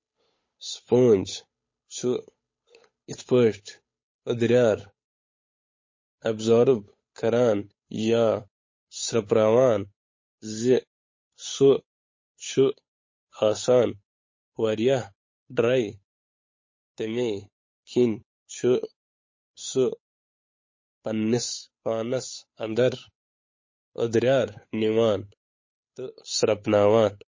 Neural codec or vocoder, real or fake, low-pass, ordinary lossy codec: codec, 16 kHz, 8 kbps, FunCodec, trained on Chinese and English, 25 frames a second; fake; 7.2 kHz; MP3, 32 kbps